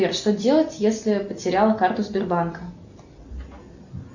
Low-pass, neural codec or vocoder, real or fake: 7.2 kHz; none; real